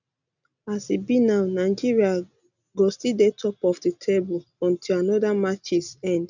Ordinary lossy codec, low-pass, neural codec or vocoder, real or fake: none; 7.2 kHz; none; real